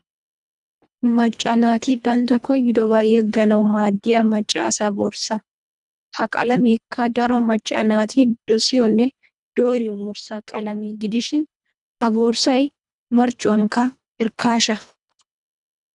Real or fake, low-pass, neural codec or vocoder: fake; 10.8 kHz; codec, 24 kHz, 1.5 kbps, HILCodec